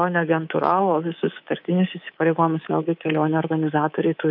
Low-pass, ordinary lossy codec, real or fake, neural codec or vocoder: 5.4 kHz; AAC, 48 kbps; fake; codec, 24 kHz, 3.1 kbps, DualCodec